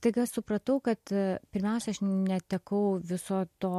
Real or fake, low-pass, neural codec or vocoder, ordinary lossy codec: real; 14.4 kHz; none; MP3, 64 kbps